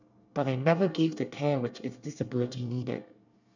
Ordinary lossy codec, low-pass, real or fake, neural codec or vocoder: none; 7.2 kHz; fake; codec, 24 kHz, 1 kbps, SNAC